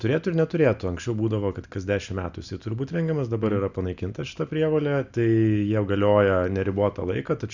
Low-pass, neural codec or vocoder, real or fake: 7.2 kHz; none; real